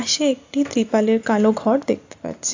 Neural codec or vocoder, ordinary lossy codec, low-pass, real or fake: none; AAC, 48 kbps; 7.2 kHz; real